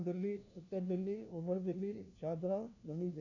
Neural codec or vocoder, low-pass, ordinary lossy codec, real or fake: codec, 16 kHz, 1 kbps, FunCodec, trained on LibriTTS, 50 frames a second; 7.2 kHz; none; fake